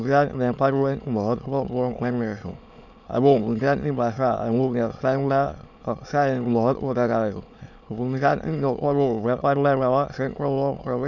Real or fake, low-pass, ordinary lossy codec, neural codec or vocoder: fake; 7.2 kHz; none; autoencoder, 22.05 kHz, a latent of 192 numbers a frame, VITS, trained on many speakers